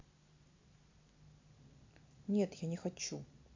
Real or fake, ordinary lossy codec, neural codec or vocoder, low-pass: real; MP3, 64 kbps; none; 7.2 kHz